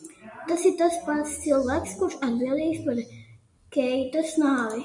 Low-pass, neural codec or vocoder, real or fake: 10.8 kHz; none; real